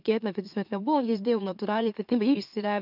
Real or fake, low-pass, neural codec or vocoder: fake; 5.4 kHz; autoencoder, 44.1 kHz, a latent of 192 numbers a frame, MeloTTS